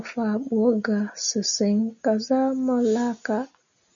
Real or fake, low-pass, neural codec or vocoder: real; 7.2 kHz; none